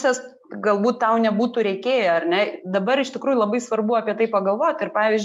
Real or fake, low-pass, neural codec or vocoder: real; 14.4 kHz; none